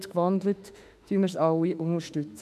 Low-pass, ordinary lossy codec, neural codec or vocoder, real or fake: 14.4 kHz; none; autoencoder, 48 kHz, 32 numbers a frame, DAC-VAE, trained on Japanese speech; fake